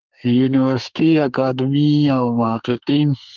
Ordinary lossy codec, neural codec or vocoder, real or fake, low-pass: Opus, 24 kbps; codec, 32 kHz, 1.9 kbps, SNAC; fake; 7.2 kHz